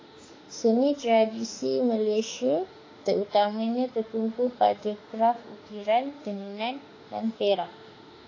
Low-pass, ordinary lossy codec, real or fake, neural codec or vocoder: 7.2 kHz; AAC, 32 kbps; fake; autoencoder, 48 kHz, 32 numbers a frame, DAC-VAE, trained on Japanese speech